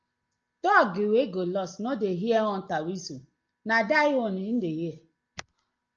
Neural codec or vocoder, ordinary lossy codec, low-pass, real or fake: none; Opus, 24 kbps; 7.2 kHz; real